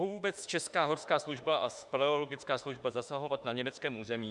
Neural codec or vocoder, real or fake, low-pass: autoencoder, 48 kHz, 32 numbers a frame, DAC-VAE, trained on Japanese speech; fake; 10.8 kHz